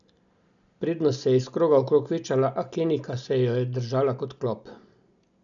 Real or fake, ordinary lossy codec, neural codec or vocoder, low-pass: real; none; none; 7.2 kHz